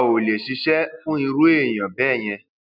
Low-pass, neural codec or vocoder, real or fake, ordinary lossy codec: 5.4 kHz; none; real; none